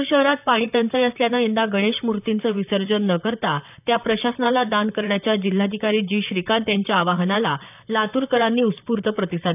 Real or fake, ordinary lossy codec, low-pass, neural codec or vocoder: fake; none; 3.6 kHz; vocoder, 44.1 kHz, 128 mel bands, Pupu-Vocoder